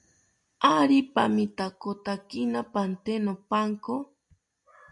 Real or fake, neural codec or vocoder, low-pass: real; none; 10.8 kHz